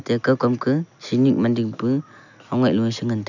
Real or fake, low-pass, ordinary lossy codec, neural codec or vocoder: real; 7.2 kHz; none; none